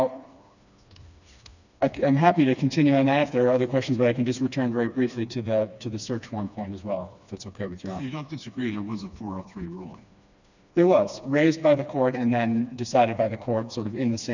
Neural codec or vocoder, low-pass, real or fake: codec, 16 kHz, 2 kbps, FreqCodec, smaller model; 7.2 kHz; fake